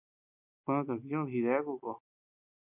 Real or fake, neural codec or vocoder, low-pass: real; none; 3.6 kHz